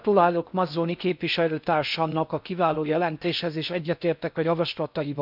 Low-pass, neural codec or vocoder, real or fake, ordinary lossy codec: 5.4 kHz; codec, 16 kHz in and 24 kHz out, 0.6 kbps, FocalCodec, streaming, 4096 codes; fake; none